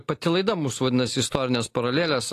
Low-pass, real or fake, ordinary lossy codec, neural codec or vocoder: 14.4 kHz; real; AAC, 48 kbps; none